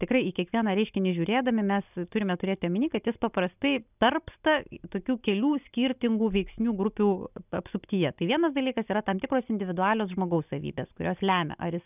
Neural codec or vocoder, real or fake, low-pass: none; real; 3.6 kHz